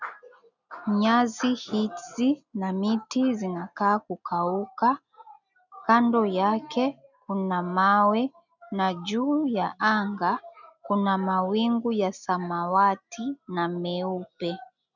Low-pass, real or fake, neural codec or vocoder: 7.2 kHz; real; none